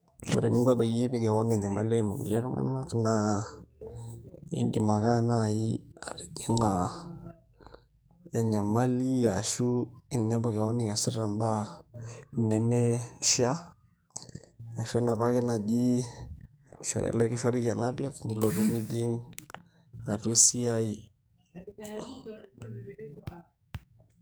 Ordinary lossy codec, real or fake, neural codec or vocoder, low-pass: none; fake; codec, 44.1 kHz, 2.6 kbps, SNAC; none